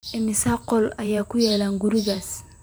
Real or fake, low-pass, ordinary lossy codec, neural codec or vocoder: fake; none; none; vocoder, 44.1 kHz, 128 mel bands every 512 samples, BigVGAN v2